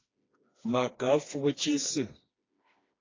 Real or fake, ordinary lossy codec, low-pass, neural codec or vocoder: fake; AAC, 32 kbps; 7.2 kHz; codec, 16 kHz, 2 kbps, FreqCodec, smaller model